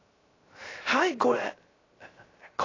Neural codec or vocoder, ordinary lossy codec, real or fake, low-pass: codec, 16 kHz, 0.3 kbps, FocalCodec; AAC, 32 kbps; fake; 7.2 kHz